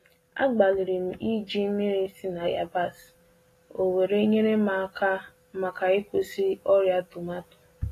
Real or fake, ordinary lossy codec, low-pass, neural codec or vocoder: real; AAC, 48 kbps; 14.4 kHz; none